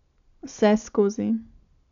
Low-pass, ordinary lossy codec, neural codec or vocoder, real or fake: 7.2 kHz; none; none; real